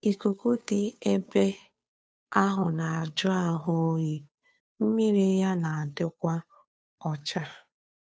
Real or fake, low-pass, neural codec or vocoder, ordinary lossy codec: fake; none; codec, 16 kHz, 2 kbps, FunCodec, trained on Chinese and English, 25 frames a second; none